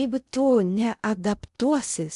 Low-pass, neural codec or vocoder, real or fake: 10.8 kHz; codec, 16 kHz in and 24 kHz out, 0.6 kbps, FocalCodec, streaming, 2048 codes; fake